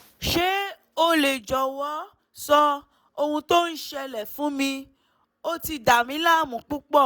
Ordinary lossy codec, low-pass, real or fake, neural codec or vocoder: none; none; real; none